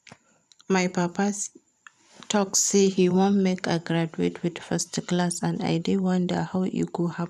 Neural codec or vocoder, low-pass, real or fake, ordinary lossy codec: vocoder, 44.1 kHz, 128 mel bands every 512 samples, BigVGAN v2; 14.4 kHz; fake; none